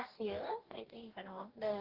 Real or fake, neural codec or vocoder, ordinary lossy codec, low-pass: fake; codec, 44.1 kHz, 2.6 kbps, DAC; Opus, 16 kbps; 5.4 kHz